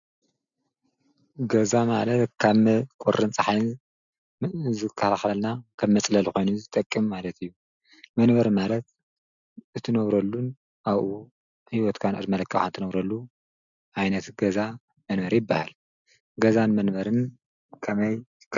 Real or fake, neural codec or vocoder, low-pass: real; none; 7.2 kHz